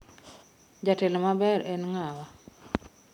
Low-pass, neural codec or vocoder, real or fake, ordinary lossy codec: 19.8 kHz; none; real; none